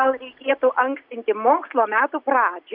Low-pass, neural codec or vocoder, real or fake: 5.4 kHz; none; real